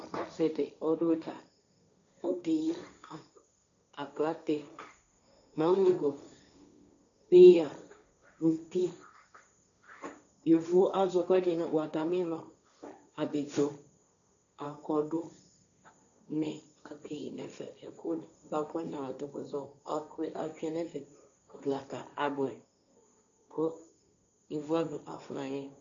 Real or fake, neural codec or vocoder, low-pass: fake; codec, 16 kHz, 1.1 kbps, Voila-Tokenizer; 7.2 kHz